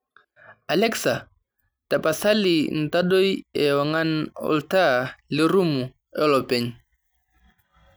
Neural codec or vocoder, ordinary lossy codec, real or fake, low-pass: none; none; real; none